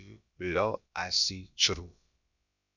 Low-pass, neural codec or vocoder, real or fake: 7.2 kHz; codec, 16 kHz, about 1 kbps, DyCAST, with the encoder's durations; fake